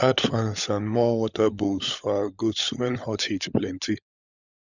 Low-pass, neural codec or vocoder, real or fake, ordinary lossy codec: 7.2 kHz; codec, 16 kHz, 16 kbps, FunCodec, trained on LibriTTS, 50 frames a second; fake; none